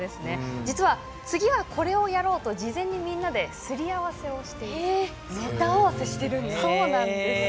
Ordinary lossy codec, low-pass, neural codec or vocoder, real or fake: none; none; none; real